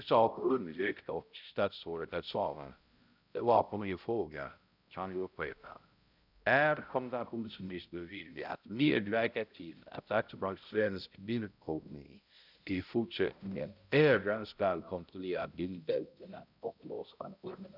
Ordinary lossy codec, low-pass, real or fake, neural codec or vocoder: none; 5.4 kHz; fake; codec, 16 kHz, 0.5 kbps, X-Codec, HuBERT features, trained on balanced general audio